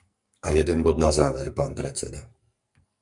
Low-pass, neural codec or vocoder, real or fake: 10.8 kHz; codec, 44.1 kHz, 2.6 kbps, SNAC; fake